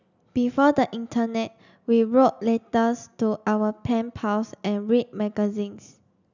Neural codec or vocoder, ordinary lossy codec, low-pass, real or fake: none; none; 7.2 kHz; real